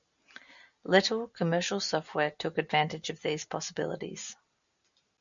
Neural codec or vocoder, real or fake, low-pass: none; real; 7.2 kHz